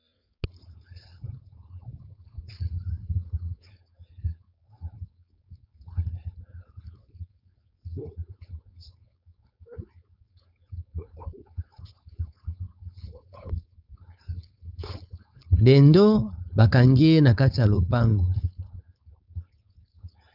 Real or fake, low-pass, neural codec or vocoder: fake; 5.4 kHz; codec, 16 kHz, 4.8 kbps, FACodec